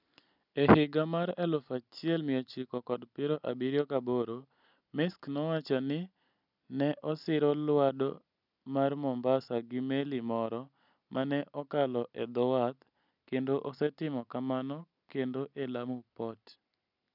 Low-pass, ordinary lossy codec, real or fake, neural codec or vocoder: 5.4 kHz; none; real; none